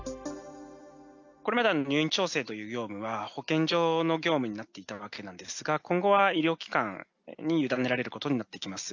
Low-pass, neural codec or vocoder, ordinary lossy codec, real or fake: 7.2 kHz; none; none; real